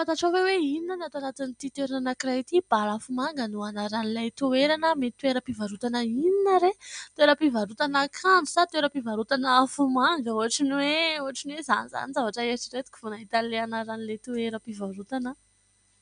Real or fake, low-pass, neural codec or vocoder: real; 9.9 kHz; none